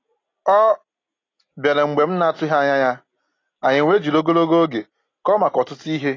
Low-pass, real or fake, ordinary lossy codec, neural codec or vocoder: 7.2 kHz; real; AAC, 32 kbps; none